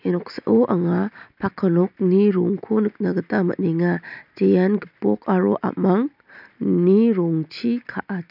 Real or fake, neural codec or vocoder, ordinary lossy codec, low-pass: real; none; none; 5.4 kHz